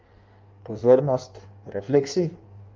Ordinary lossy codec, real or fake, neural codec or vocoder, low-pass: Opus, 24 kbps; fake; codec, 16 kHz in and 24 kHz out, 1.1 kbps, FireRedTTS-2 codec; 7.2 kHz